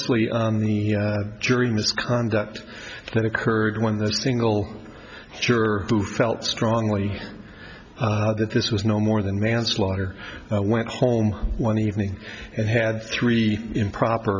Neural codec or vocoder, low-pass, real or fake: none; 7.2 kHz; real